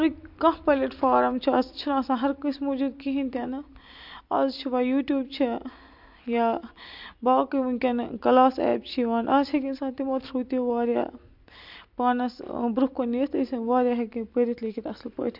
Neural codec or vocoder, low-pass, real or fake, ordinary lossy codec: none; 5.4 kHz; real; MP3, 48 kbps